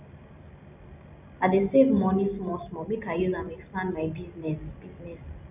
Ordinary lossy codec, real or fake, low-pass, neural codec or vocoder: none; real; 3.6 kHz; none